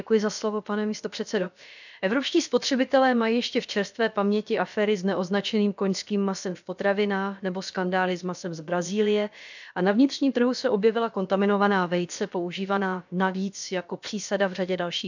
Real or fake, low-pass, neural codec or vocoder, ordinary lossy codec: fake; 7.2 kHz; codec, 16 kHz, about 1 kbps, DyCAST, with the encoder's durations; none